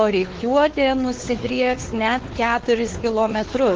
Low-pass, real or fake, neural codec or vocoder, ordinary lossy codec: 7.2 kHz; fake; codec, 16 kHz, 4 kbps, X-Codec, WavLM features, trained on Multilingual LibriSpeech; Opus, 16 kbps